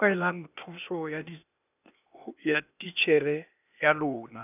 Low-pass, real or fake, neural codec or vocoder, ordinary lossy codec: 3.6 kHz; fake; codec, 16 kHz, 0.8 kbps, ZipCodec; none